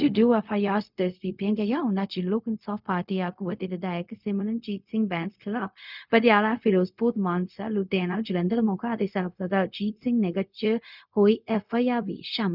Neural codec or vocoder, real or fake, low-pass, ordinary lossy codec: codec, 16 kHz, 0.4 kbps, LongCat-Audio-Codec; fake; 5.4 kHz; none